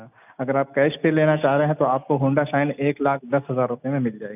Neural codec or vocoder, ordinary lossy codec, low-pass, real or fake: none; none; 3.6 kHz; real